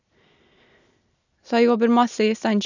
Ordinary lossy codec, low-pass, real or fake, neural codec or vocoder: none; 7.2 kHz; real; none